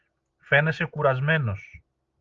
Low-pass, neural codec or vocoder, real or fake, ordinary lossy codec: 7.2 kHz; none; real; Opus, 32 kbps